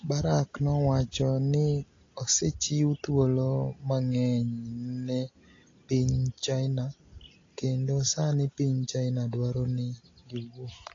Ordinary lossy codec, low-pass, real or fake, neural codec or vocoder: AAC, 32 kbps; 7.2 kHz; real; none